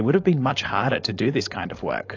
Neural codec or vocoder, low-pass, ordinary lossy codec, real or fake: codec, 16 kHz, 4.8 kbps, FACodec; 7.2 kHz; AAC, 48 kbps; fake